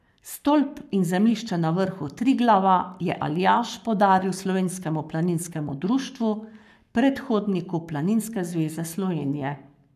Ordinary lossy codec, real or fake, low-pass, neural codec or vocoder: none; fake; 14.4 kHz; codec, 44.1 kHz, 7.8 kbps, Pupu-Codec